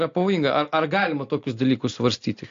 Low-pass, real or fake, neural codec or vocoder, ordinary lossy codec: 7.2 kHz; real; none; AAC, 48 kbps